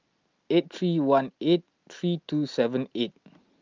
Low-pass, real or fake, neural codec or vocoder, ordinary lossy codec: 7.2 kHz; real; none; Opus, 24 kbps